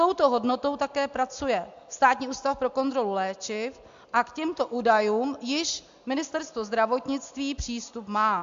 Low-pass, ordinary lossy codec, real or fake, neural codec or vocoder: 7.2 kHz; AAC, 64 kbps; real; none